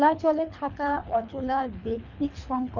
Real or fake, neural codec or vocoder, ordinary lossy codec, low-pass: fake; codec, 24 kHz, 3 kbps, HILCodec; none; 7.2 kHz